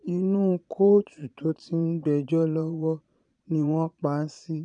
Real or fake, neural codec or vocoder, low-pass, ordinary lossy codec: fake; vocoder, 22.05 kHz, 80 mel bands, Vocos; 9.9 kHz; none